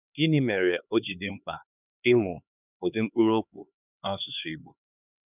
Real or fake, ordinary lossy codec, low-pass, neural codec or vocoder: fake; none; 3.6 kHz; codec, 16 kHz, 2 kbps, X-Codec, HuBERT features, trained on LibriSpeech